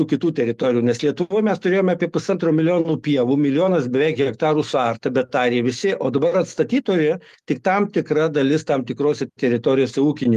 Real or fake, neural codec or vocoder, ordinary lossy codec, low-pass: fake; autoencoder, 48 kHz, 128 numbers a frame, DAC-VAE, trained on Japanese speech; Opus, 32 kbps; 14.4 kHz